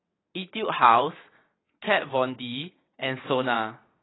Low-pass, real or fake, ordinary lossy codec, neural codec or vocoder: 7.2 kHz; fake; AAC, 16 kbps; vocoder, 22.05 kHz, 80 mel bands, WaveNeXt